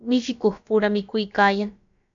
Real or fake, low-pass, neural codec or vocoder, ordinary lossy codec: fake; 7.2 kHz; codec, 16 kHz, about 1 kbps, DyCAST, with the encoder's durations; AAC, 64 kbps